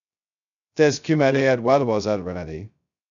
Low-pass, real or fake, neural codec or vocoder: 7.2 kHz; fake; codec, 16 kHz, 0.2 kbps, FocalCodec